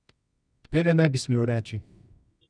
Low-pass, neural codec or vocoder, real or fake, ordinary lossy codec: 9.9 kHz; codec, 24 kHz, 0.9 kbps, WavTokenizer, medium music audio release; fake; none